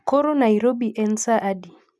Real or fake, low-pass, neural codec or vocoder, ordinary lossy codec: real; none; none; none